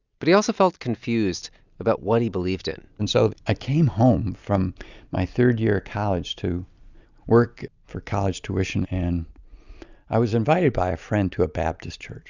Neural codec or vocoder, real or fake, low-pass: none; real; 7.2 kHz